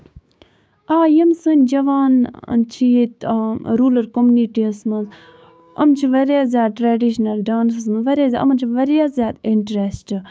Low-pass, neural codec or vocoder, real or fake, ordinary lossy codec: none; codec, 16 kHz, 6 kbps, DAC; fake; none